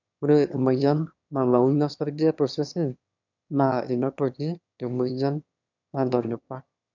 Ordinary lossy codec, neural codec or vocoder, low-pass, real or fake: none; autoencoder, 22.05 kHz, a latent of 192 numbers a frame, VITS, trained on one speaker; 7.2 kHz; fake